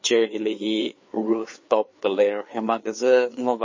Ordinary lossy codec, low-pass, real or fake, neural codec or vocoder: MP3, 32 kbps; 7.2 kHz; fake; codec, 16 kHz, 4 kbps, FreqCodec, larger model